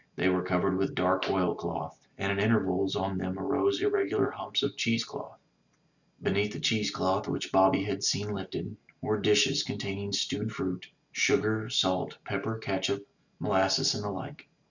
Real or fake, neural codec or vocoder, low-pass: real; none; 7.2 kHz